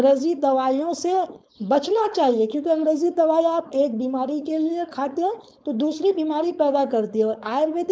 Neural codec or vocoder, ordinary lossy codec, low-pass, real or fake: codec, 16 kHz, 4.8 kbps, FACodec; none; none; fake